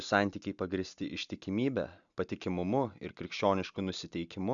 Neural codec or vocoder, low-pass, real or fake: none; 7.2 kHz; real